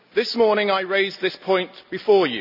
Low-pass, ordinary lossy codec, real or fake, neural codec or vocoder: 5.4 kHz; none; real; none